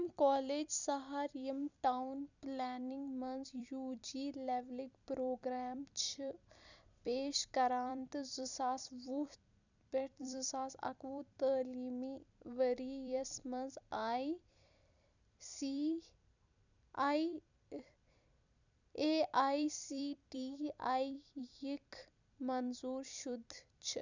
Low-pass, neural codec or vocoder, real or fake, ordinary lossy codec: 7.2 kHz; vocoder, 44.1 kHz, 128 mel bands every 256 samples, BigVGAN v2; fake; none